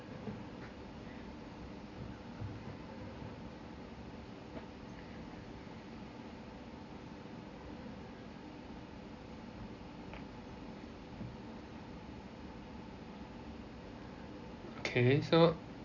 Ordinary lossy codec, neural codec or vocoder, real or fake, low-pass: MP3, 48 kbps; none; real; 7.2 kHz